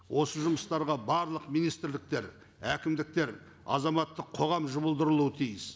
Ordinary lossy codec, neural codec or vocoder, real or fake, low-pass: none; none; real; none